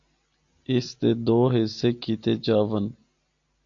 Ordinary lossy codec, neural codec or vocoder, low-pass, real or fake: AAC, 64 kbps; none; 7.2 kHz; real